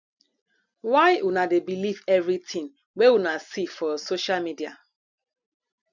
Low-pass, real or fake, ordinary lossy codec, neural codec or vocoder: 7.2 kHz; real; none; none